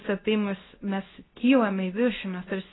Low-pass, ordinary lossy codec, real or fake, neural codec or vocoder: 7.2 kHz; AAC, 16 kbps; fake; codec, 24 kHz, 0.9 kbps, WavTokenizer, medium speech release version 2